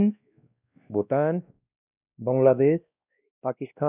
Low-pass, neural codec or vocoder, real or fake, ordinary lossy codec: 3.6 kHz; codec, 16 kHz, 1 kbps, X-Codec, WavLM features, trained on Multilingual LibriSpeech; fake; none